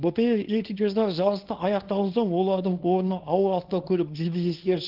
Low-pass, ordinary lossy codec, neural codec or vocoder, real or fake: 5.4 kHz; Opus, 16 kbps; codec, 24 kHz, 0.9 kbps, WavTokenizer, small release; fake